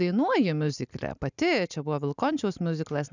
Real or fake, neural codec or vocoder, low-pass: real; none; 7.2 kHz